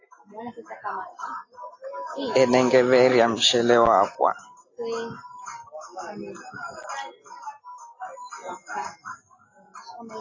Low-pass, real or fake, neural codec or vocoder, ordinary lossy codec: 7.2 kHz; real; none; AAC, 32 kbps